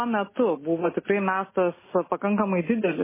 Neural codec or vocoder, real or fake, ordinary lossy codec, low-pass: none; real; MP3, 16 kbps; 3.6 kHz